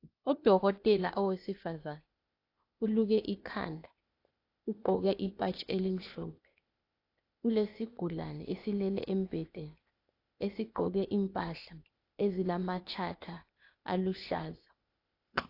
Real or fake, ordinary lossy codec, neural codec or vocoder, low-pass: fake; AAC, 32 kbps; codec, 16 kHz, 0.8 kbps, ZipCodec; 5.4 kHz